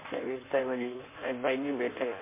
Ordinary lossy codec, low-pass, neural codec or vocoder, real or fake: MP3, 24 kbps; 3.6 kHz; codec, 16 kHz in and 24 kHz out, 1.1 kbps, FireRedTTS-2 codec; fake